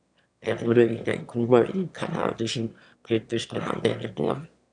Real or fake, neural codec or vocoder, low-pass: fake; autoencoder, 22.05 kHz, a latent of 192 numbers a frame, VITS, trained on one speaker; 9.9 kHz